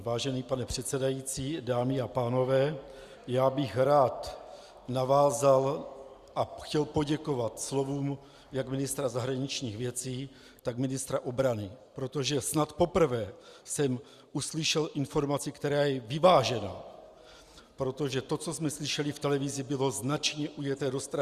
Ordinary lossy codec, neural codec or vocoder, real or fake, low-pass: Opus, 64 kbps; none; real; 14.4 kHz